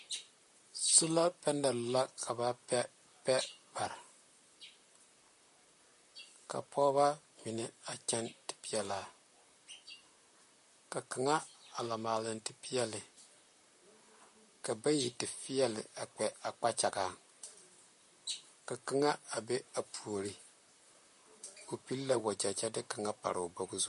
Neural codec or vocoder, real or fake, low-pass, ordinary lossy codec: vocoder, 44.1 kHz, 128 mel bands, Pupu-Vocoder; fake; 14.4 kHz; MP3, 48 kbps